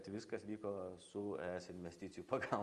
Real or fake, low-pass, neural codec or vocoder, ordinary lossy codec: real; 10.8 kHz; none; Opus, 32 kbps